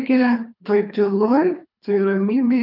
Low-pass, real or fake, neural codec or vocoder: 5.4 kHz; fake; codec, 24 kHz, 3 kbps, HILCodec